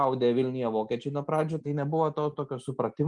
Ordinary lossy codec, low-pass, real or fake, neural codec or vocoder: Opus, 32 kbps; 10.8 kHz; real; none